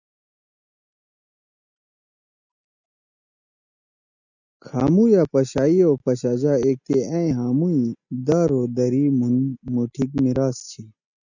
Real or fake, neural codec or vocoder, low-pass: real; none; 7.2 kHz